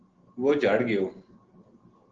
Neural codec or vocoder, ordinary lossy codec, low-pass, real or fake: none; Opus, 16 kbps; 7.2 kHz; real